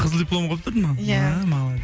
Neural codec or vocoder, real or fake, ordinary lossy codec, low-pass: none; real; none; none